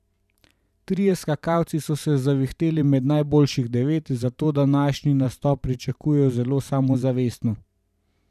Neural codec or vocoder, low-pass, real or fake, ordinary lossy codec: vocoder, 44.1 kHz, 128 mel bands every 512 samples, BigVGAN v2; 14.4 kHz; fake; none